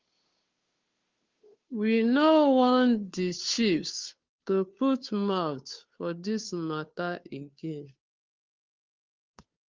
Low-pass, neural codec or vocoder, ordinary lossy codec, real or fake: 7.2 kHz; codec, 16 kHz, 2 kbps, FunCodec, trained on Chinese and English, 25 frames a second; Opus, 32 kbps; fake